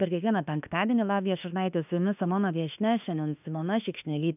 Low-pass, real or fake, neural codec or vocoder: 3.6 kHz; fake; autoencoder, 48 kHz, 32 numbers a frame, DAC-VAE, trained on Japanese speech